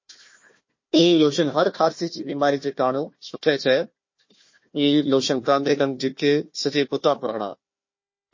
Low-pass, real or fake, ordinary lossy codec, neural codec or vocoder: 7.2 kHz; fake; MP3, 32 kbps; codec, 16 kHz, 1 kbps, FunCodec, trained on Chinese and English, 50 frames a second